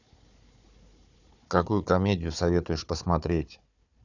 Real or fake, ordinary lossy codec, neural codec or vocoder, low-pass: fake; none; codec, 16 kHz, 4 kbps, FunCodec, trained on Chinese and English, 50 frames a second; 7.2 kHz